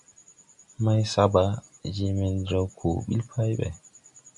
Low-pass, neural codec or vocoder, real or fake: 10.8 kHz; none; real